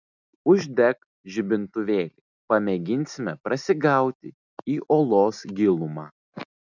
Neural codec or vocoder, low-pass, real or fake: none; 7.2 kHz; real